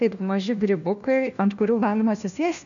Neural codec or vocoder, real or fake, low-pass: codec, 16 kHz, 1 kbps, FunCodec, trained on LibriTTS, 50 frames a second; fake; 7.2 kHz